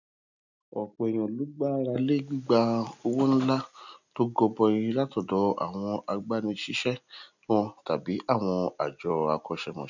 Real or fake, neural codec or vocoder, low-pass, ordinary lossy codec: real; none; 7.2 kHz; none